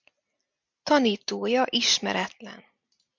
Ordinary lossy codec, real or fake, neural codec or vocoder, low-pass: MP3, 64 kbps; real; none; 7.2 kHz